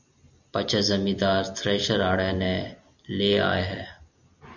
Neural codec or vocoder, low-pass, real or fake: none; 7.2 kHz; real